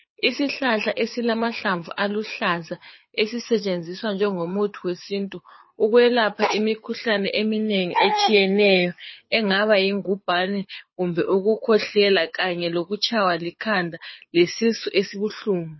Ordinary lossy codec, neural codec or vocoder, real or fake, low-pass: MP3, 24 kbps; codec, 24 kHz, 6 kbps, HILCodec; fake; 7.2 kHz